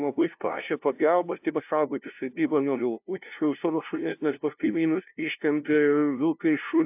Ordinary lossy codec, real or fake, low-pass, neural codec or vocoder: AAC, 32 kbps; fake; 3.6 kHz; codec, 16 kHz, 0.5 kbps, FunCodec, trained on LibriTTS, 25 frames a second